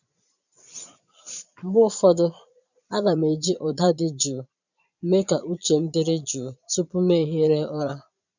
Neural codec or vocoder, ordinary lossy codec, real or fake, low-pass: vocoder, 22.05 kHz, 80 mel bands, Vocos; none; fake; 7.2 kHz